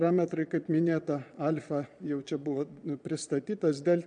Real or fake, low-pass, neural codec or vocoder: fake; 9.9 kHz; vocoder, 22.05 kHz, 80 mel bands, Vocos